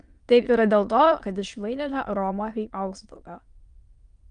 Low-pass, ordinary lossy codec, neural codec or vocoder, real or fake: 9.9 kHz; Opus, 32 kbps; autoencoder, 22.05 kHz, a latent of 192 numbers a frame, VITS, trained on many speakers; fake